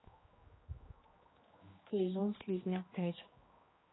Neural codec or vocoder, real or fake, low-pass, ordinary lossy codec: codec, 16 kHz, 2 kbps, X-Codec, HuBERT features, trained on general audio; fake; 7.2 kHz; AAC, 16 kbps